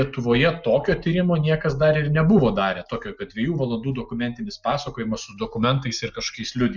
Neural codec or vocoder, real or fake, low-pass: none; real; 7.2 kHz